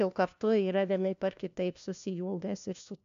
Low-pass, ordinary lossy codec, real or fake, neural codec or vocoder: 7.2 kHz; MP3, 64 kbps; fake; codec, 16 kHz, 1 kbps, FunCodec, trained on LibriTTS, 50 frames a second